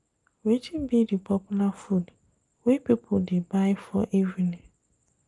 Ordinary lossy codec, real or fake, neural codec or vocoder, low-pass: Opus, 32 kbps; real; none; 10.8 kHz